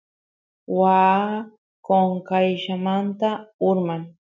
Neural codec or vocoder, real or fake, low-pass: none; real; 7.2 kHz